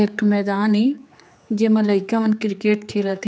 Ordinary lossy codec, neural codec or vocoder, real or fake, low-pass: none; codec, 16 kHz, 4 kbps, X-Codec, HuBERT features, trained on general audio; fake; none